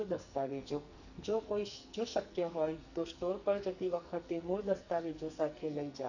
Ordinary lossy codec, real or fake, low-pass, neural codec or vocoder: none; fake; 7.2 kHz; codec, 44.1 kHz, 2.6 kbps, SNAC